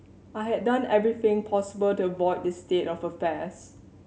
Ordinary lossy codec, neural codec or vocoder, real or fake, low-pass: none; none; real; none